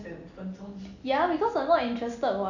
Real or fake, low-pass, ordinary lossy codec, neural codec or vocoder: real; 7.2 kHz; none; none